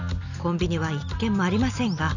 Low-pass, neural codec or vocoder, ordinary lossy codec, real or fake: 7.2 kHz; none; none; real